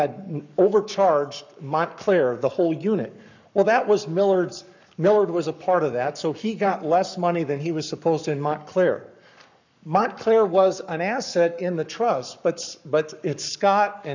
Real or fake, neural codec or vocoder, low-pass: fake; codec, 44.1 kHz, 7.8 kbps, Pupu-Codec; 7.2 kHz